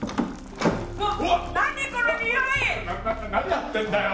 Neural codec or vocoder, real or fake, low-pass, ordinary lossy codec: none; real; none; none